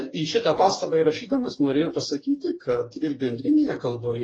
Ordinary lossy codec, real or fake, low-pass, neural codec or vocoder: AAC, 32 kbps; fake; 9.9 kHz; codec, 44.1 kHz, 2.6 kbps, DAC